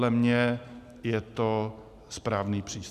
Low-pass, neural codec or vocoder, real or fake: 14.4 kHz; none; real